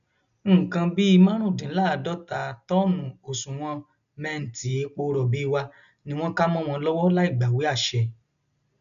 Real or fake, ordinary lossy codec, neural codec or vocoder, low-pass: real; none; none; 7.2 kHz